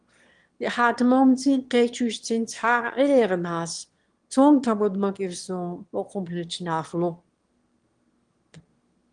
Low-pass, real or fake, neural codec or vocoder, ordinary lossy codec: 9.9 kHz; fake; autoencoder, 22.05 kHz, a latent of 192 numbers a frame, VITS, trained on one speaker; Opus, 24 kbps